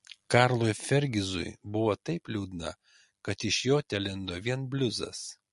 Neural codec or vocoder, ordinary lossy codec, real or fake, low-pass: none; MP3, 48 kbps; real; 14.4 kHz